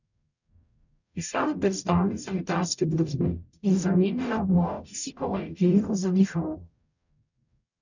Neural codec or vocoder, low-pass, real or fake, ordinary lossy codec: codec, 44.1 kHz, 0.9 kbps, DAC; 7.2 kHz; fake; none